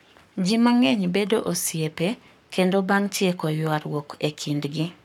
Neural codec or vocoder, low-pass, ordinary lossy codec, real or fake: codec, 44.1 kHz, 7.8 kbps, Pupu-Codec; 19.8 kHz; none; fake